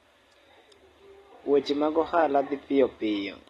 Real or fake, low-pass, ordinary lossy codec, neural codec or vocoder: real; 19.8 kHz; AAC, 32 kbps; none